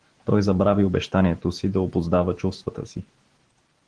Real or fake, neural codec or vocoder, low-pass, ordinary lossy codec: real; none; 9.9 kHz; Opus, 16 kbps